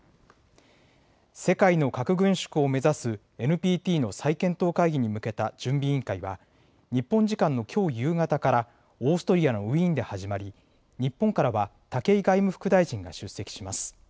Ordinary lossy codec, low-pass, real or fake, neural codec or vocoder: none; none; real; none